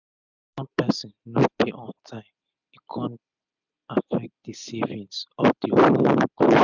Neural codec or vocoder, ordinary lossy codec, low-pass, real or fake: none; none; 7.2 kHz; real